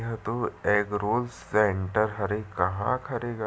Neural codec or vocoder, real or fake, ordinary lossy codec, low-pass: none; real; none; none